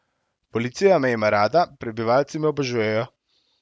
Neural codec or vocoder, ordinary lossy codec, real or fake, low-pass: none; none; real; none